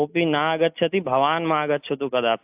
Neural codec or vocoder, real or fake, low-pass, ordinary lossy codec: none; real; 3.6 kHz; AAC, 32 kbps